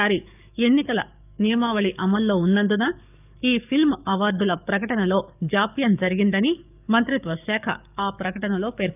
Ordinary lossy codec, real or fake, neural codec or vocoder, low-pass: none; fake; codec, 44.1 kHz, 7.8 kbps, DAC; 3.6 kHz